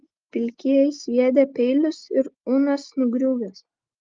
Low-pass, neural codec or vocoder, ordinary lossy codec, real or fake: 7.2 kHz; none; Opus, 32 kbps; real